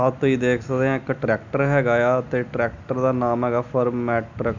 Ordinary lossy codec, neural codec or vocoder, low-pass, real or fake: none; none; 7.2 kHz; real